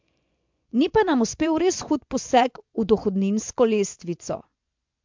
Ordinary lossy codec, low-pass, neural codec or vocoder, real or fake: MP3, 64 kbps; 7.2 kHz; vocoder, 24 kHz, 100 mel bands, Vocos; fake